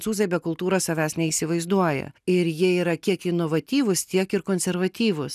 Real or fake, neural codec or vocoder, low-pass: real; none; 14.4 kHz